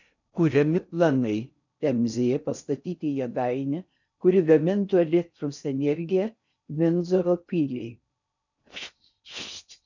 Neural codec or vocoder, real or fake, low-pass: codec, 16 kHz in and 24 kHz out, 0.6 kbps, FocalCodec, streaming, 4096 codes; fake; 7.2 kHz